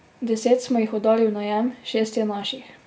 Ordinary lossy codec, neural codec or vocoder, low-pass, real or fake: none; none; none; real